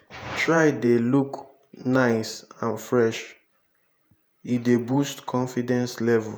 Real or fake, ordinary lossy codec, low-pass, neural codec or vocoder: fake; none; none; vocoder, 48 kHz, 128 mel bands, Vocos